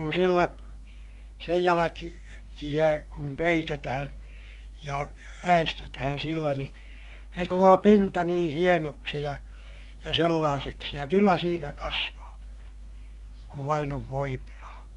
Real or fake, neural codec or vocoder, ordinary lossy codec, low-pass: fake; codec, 24 kHz, 1 kbps, SNAC; none; 10.8 kHz